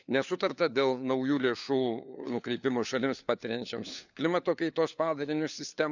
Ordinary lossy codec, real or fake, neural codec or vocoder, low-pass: none; fake; codec, 16 kHz, 4 kbps, FunCodec, trained on LibriTTS, 50 frames a second; 7.2 kHz